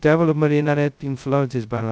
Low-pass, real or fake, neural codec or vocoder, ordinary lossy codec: none; fake; codec, 16 kHz, 0.2 kbps, FocalCodec; none